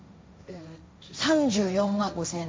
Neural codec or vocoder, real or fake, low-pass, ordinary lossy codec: codec, 16 kHz, 1.1 kbps, Voila-Tokenizer; fake; none; none